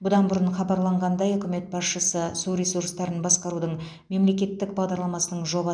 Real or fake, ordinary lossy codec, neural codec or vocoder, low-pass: real; none; none; 9.9 kHz